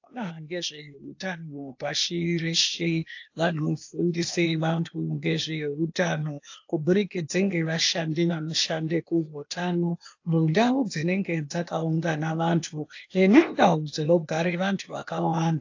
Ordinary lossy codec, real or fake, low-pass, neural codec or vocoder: AAC, 48 kbps; fake; 7.2 kHz; codec, 16 kHz, 0.8 kbps, ZipCodec